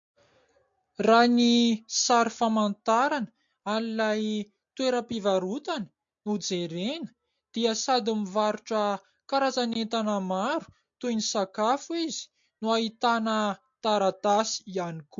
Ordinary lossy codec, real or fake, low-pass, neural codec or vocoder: MP3, 48 kbps; real; 7.2 kHz; none